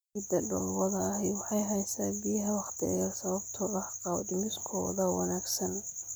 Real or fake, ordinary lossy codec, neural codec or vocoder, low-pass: real; none; none; none